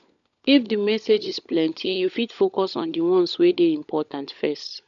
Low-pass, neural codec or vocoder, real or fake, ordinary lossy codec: 7.2 kHz; codec, 16 kHz, 16 kbps, FunCodec, trained on LibriTTS, 50 frames a second; fake; none